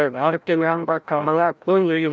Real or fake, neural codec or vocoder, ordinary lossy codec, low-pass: fake; codec, 16 kHz, 0.5 kbps, FreqCodec, larger model; none; none